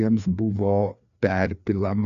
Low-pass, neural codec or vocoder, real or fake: 7.2 kHz; codec, 16 kHz, 2 kbps, FreqCodec, larger model; fake